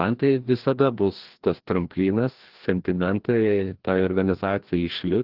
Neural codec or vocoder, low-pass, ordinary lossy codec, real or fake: codec, 16 kHz, 1 kbps, FreqCodec, larger model; 5.4 kHz; Opus, 16 kbps; fake